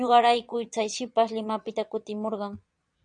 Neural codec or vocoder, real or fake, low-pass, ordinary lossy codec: vocoder, 22.05 kHz, 80 mel bands, Vocos; fake; 9.9 kHz; MP3, 96 kbps